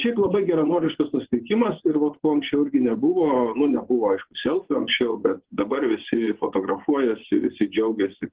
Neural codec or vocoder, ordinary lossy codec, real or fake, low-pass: vocoder, 24 kHz, 100 mel bands, Vocos; Opus, 16 kbps; fake; 3.6 kHz